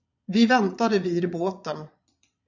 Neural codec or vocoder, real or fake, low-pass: vocoder, 22.05 kHz, 80 mel bands, Vocos; fake; 7.2 kHz